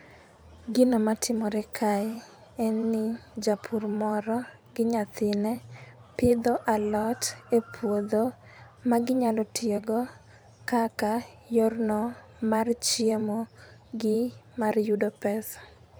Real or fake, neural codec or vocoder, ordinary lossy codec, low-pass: fake; vocoder, 44.1 kHz, 128 mel bands every 512 samples, BigVGAN v2; none; none